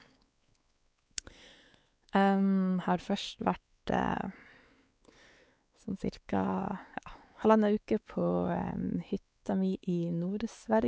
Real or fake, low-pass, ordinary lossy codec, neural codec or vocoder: fake; none; none; codec, 16 kHz, 4 kbps, X-Codec, WavLM features, trained on Multilingual LibriSpeech